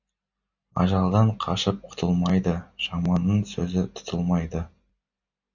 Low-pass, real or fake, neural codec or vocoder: 7.2 kHz; real; none